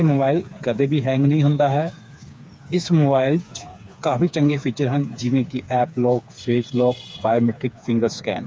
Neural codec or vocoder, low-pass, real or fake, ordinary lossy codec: codec, 16 kHz, 4 kbps, FreqCodec, smaller model; none; fake; none